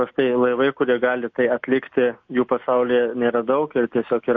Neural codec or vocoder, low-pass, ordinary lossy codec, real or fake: none; 7.2 kHz; MP3, 48 kbps; real